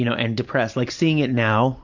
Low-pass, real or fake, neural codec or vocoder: 7.2 kHz; real; none